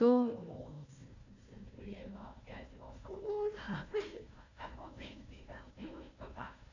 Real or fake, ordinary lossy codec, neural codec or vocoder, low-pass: fake; none; codec, 16 kHz, 1 kbps, FunCodec, trained on Chinese and English, 50 frames a second; 7.2 kHz